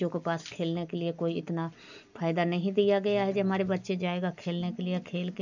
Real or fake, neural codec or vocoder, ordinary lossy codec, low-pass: real; none; none; 7.2 kHz